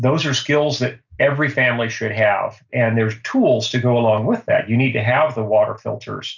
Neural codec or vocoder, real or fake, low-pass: none; real; 7.2 kHz